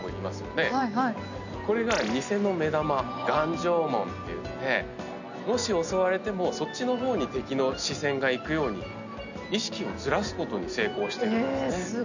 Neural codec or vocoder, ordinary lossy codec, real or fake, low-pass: none; none; real; 7.2 kHz